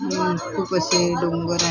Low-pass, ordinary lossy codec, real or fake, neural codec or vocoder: 7.2 kHz; none; real; none